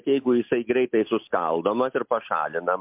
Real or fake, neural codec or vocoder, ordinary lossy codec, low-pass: real; none; MP3, 32 kbps; 3.6 kHz